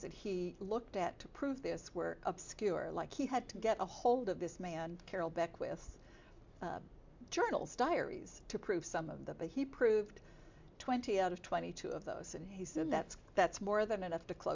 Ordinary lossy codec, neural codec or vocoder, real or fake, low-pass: MP3, 64 kbps; none; real; 7.2 kHz